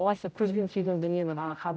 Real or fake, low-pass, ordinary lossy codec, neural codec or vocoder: fake; none; none; codec, 16 kHz, 0.5 kbps, X-Codec, HuBERT features, trained on general audio